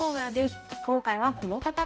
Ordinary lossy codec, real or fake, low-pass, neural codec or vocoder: none; fake; none; codec, 16 kHz, 0.5 kbps, X-Codec, HuBERT features, trained on balanced general audio